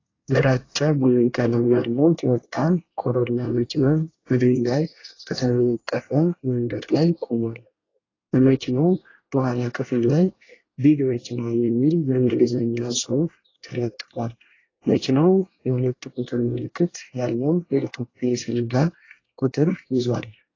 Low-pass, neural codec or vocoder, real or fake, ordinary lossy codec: 7.2 kHz; codec, 24 kHz, 1 kbps, SNAC; fake; AAC, 32 kbps